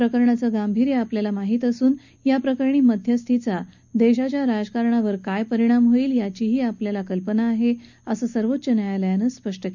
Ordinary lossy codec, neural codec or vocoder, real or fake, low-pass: none; none; real; 7.2 kHz